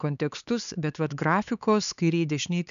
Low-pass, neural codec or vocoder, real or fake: 7.2 kHz; codec, 16 kHz, 4 kbps, X-Codec, HuBERT features, trained on LibriSpeech; fake